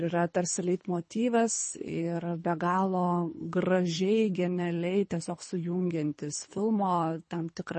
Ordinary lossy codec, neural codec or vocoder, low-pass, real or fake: MP3, 32 kbps; codec, 24 kHz, 3 kbps, HILCodec; 10.8 kHz; fake